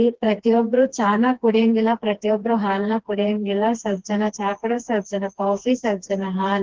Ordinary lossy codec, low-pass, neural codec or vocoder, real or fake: Opus, 16 kbps; 7.2 kHz; codec, 16 kHz, 2 kbps, FreqCodec, smaller model; fake